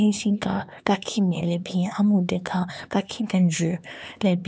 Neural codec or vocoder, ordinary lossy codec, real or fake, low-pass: codec, 16 kHz, 4 kbps, X-Codec, HuBERT features, trained on LibriSpeech; none; fake; none